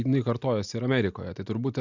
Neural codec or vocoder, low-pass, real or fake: none; 7.2 kHz; real